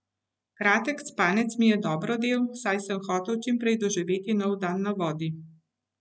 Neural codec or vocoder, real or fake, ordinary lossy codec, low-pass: none; real; none; none